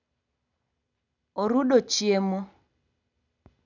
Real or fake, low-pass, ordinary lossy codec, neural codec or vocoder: real; 7.2 kHz; none; none